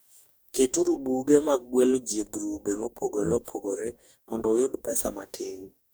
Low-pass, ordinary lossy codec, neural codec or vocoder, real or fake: none; none; codec, 44.1 kHz, 2.6 kbps, DAC; fake